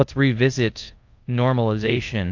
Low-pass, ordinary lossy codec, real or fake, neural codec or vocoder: 7.2 kHz; AAC, 48 kbps; fake; codec, 24 kHz, 0.5 kbps, DualCodec